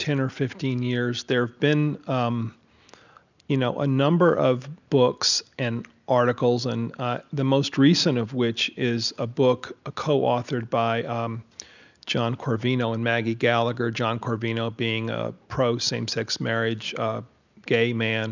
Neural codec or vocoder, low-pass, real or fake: none; 7.2 kHz; real